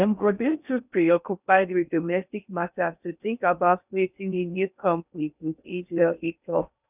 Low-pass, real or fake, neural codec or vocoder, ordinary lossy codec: 3.6 kHz; fake; codec, 16 kHz in and 24 kHz out, 0.6 kbps, FocalCodec, streaming, 4096 codes; none